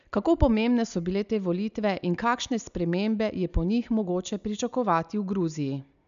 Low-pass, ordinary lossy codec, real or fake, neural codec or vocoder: 7.2 kHz; none; real; none